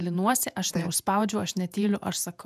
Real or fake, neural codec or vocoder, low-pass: fake; vocoder, 48 kHz, 128 mel bands, Vocos; 14.4 kHz